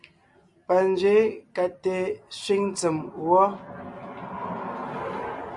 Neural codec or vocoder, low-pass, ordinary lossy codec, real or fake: none; 10.8 kHz; Opus, 64 kbps; real